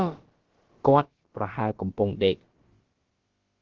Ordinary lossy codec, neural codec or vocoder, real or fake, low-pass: Opus, 16 kbps; codec, 16 kHz, about 1 kbps, DyCAST, with the encoder's durations; fake; 7.2 kHz